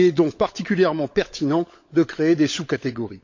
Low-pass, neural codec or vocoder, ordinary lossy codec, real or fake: 7.2 kHz; codec, 16 kHz, 16 kbps, FunCodec, trained on LibriTTS, 50 frames a second; MP3, 48 kbps; fake